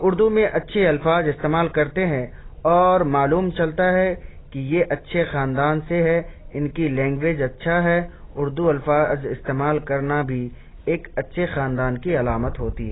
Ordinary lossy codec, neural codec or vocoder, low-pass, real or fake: AAC, 16 kbps; none; 7.2 kHz; real